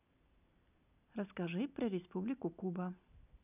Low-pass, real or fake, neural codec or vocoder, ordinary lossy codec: 3.6 kHz; real; none; none